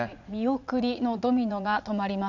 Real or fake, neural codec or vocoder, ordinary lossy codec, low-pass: fake; vocoder, 44.1 kHz, 80 mel bands, Vocos; none; 7.2 kHz